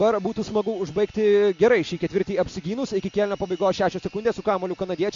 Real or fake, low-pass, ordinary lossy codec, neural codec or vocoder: real; 7.2 kHz; MP3, 48 kbps; none